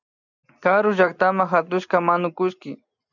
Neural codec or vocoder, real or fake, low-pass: none; real; 7.2 kHz